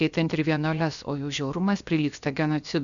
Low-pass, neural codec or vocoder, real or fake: 7.2 kHz; codec, 16 kHz, about 1 kbps, DyCAST, with the encoder's durations; fake